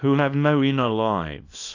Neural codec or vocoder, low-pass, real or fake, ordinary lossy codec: codec, 24 kHz, 0.9 kbps, WavTokenizer, small release; 7.2 kHz; fake; AAC, 48 kbps